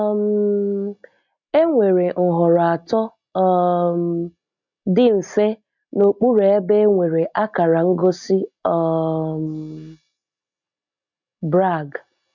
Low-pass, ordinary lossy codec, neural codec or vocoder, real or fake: 7.2 kHz; MP3, 64 kbps; none; real